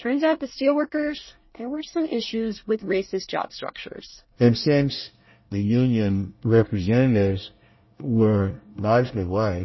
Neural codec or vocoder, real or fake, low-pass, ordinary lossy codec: codec, 24 kHz, 1 kbps, SNAC; fake; 7.2 kHz; MP3, 24 kbps